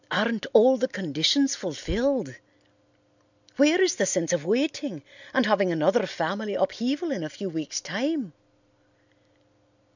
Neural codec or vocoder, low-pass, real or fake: none; 7.2 kHz; real